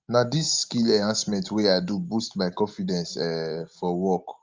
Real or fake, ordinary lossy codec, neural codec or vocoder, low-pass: real; Opus, 32 kbps; none; 7.2 kHz